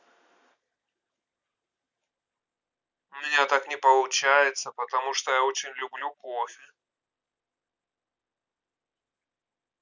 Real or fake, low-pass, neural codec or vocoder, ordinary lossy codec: real; 7.2 kHz; none; none